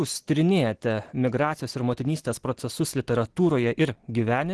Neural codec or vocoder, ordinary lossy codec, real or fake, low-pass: none; Opus, 16 kbps; real; 10.8 kHz